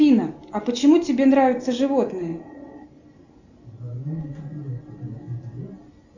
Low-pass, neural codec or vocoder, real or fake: 7.2 kHz; none; real